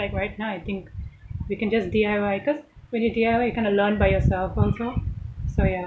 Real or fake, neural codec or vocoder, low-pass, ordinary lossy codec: real; none; none; none